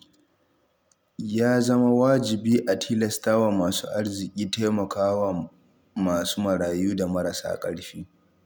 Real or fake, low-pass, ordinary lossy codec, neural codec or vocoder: real; none; none; none